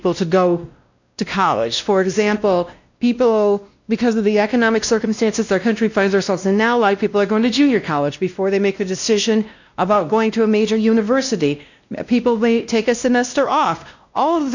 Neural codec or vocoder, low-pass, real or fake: codec, 16 kHz, 1 kbps, X-Codec, WavLM features, trained on Multilingual LibriSpeech; 7.2 kHz; fake